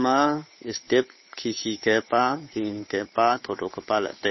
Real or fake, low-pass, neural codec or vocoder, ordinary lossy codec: fake; 7.2 kHz; codec, 16 kHz, 8 kbps, FunCodec, trained on Chinese and English, 25 frames a second; MP3, 24 kbps